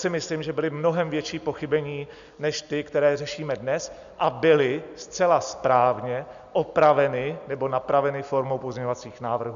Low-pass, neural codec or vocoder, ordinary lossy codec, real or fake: 7.2 kHz; none; AAC, 96 kbps; real